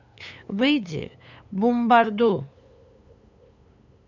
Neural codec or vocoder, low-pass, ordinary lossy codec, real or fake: codec, 16 kHz, 8 kbps, FunCodec, trained on LibriTTS, 25 frames a second; 7.2 kHz; none; fake